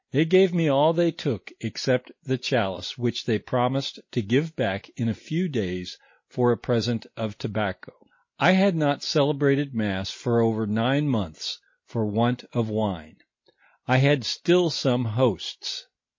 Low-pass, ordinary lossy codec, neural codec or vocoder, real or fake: 7.2 kHz; MP3, 32 kbps; none; real